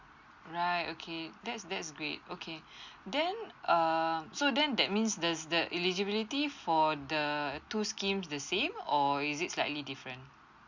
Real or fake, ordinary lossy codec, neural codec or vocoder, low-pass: real; Opus, 64 kbps; none; 7.2 kHz